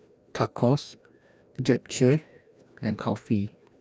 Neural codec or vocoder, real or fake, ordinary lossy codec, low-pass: codec, 16 kHz, 1 kbps, FreqCodec, larger model; fake; none; none